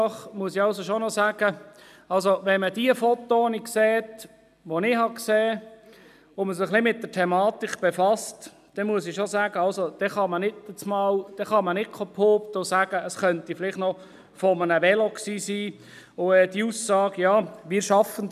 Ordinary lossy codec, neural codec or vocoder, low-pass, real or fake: none; none; 14.4 kHz; real